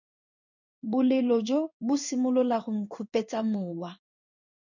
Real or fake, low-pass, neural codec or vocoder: fake; 7.2 kHz; codec, 16 kHz in and 24 kHz out, 1 kbps, XY-Tokenizer